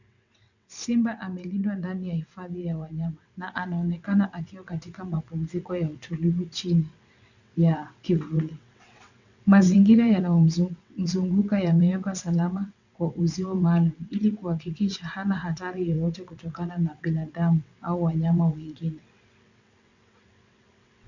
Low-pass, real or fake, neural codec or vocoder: 7.2 kHz; fake; vocoder, 22.05 kHz, 80 mel bands, WaveNeXt